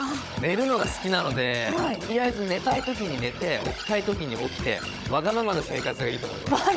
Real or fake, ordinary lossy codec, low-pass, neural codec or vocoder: fake; none; none; codec, 16 kHz, 16 kbps, FunCodec, trained on Chinese and English, 50 frames a second